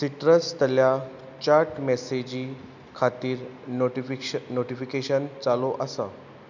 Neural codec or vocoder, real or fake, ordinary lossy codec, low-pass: none; real; none; 7.2 kHz